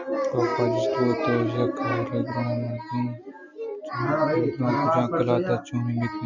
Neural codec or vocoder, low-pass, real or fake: none; 7.2 kHz; real